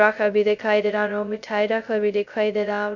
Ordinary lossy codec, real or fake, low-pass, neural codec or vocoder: none; fake; 7.2 kHz; codec, 16 kHz, 0.2 kbps, FocalCodec